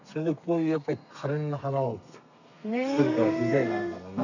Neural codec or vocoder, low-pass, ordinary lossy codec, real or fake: codec, 32 kHz, 1.9 kbps, SNAC; 7.2 kHz; none; fake